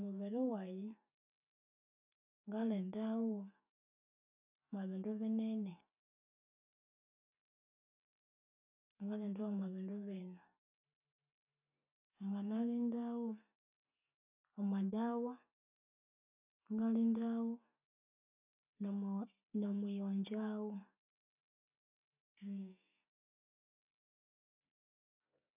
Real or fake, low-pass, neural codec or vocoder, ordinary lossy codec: real; 3.6 kHz; none; none